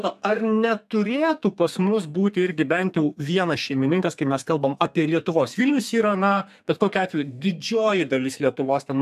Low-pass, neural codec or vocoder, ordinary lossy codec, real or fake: 14.4 kHz; codec, 32 kHz, 1.9 kbps, SNAC; AAC, 96 kbps; fake